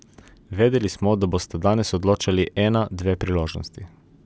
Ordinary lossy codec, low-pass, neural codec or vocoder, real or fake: none; none; none; real